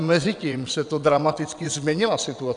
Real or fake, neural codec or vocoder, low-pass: fake; vocoder, 22.05 kHz, 80 mel bands, WaveNeXt; 9.9 kHz